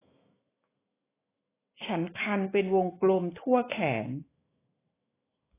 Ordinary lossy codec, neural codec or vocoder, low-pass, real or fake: AAC, 16 kbps; autoencoder, 48 kHz, 128 numbers a frame, DAC-VAE, trained on Japanese speech; 3.6 kHz; fake